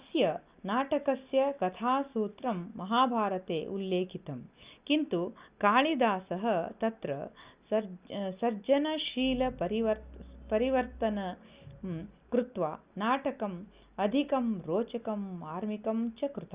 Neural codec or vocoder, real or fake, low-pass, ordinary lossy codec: none; real; 3.6 kHz; Opus, 64 kbps